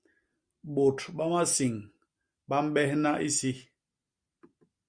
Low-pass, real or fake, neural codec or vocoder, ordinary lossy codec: 9.9 kHz; real; none; Opus, 64 kbps